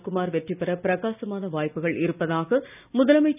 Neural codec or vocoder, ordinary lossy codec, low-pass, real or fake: none; none; 3.6 kHz; real